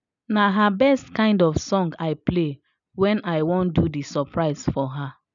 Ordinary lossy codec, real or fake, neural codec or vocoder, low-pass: none; real; none; 7.2 kHz